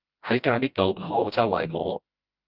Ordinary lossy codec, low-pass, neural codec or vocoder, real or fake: Opus, 32 kbps; 5.4 kHz; codec, 16 kHz, 1 kbps, FreqCodec, smaller model; fake